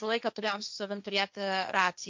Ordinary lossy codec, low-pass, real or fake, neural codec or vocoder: MP3, 64 kbps; 7.2 kHz; fake; codec, 16 kHz, 1.1 kbps, Voila-Tokenizer